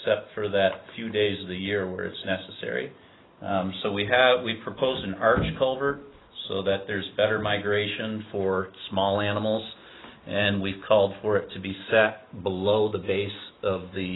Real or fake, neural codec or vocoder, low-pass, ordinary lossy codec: real; none; 7.2 kHz; AAC, 16 kbps